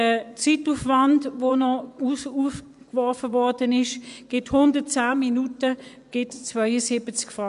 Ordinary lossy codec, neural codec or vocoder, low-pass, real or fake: none; vocoder, 24 kHz, 100 mel bands, Vocos; 10.8 kHz; fake